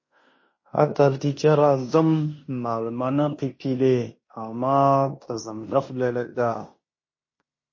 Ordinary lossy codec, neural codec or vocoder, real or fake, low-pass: MP3, 32 kbps; codec, 16 kHz in and 24 kHz out, 0.9 kbps, LongCat-Audio-Codec, fine tuned four codebook decoder; fake; 7.2 kHz